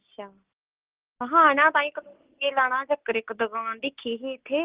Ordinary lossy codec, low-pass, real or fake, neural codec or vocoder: Opus, 16 kbps; 3.6 kHz; real; none